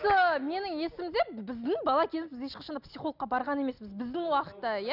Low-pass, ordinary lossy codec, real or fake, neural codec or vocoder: 5.4 kHz; none; real; none